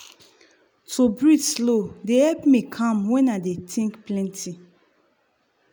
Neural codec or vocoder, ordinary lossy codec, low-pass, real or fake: none; none; none; real